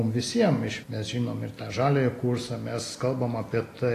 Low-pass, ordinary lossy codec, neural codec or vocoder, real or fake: 14.4 kHz; AAC, 48 kbps; none; real